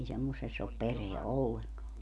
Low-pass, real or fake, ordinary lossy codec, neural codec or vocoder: none; real; none; none